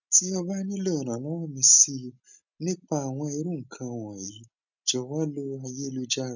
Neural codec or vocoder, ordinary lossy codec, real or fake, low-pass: none; none; real; 7.2 kHz